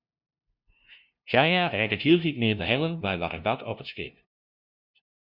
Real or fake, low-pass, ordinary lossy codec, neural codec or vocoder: fake; 5.4 kHz; Opus, 64 kbps; codec, 16 kHz, 0.5 kbps, FunCodec, trained on LibriTTS, 25 frames a second